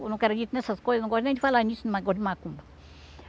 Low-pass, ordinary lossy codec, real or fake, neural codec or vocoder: none; none; real; none